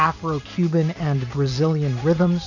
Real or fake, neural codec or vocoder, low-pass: fake; vocoder, 44.1 kHz, 128 mel bands every 512 samples, BigVGAN v2; 7.2 kHz